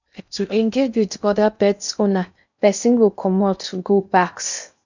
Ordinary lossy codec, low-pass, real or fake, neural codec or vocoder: none; 7.2 kHz; fake; codec, 16 kHz in and 24 kHz out, 0.6 kbps, FocalCodec, streaming, 2048 codes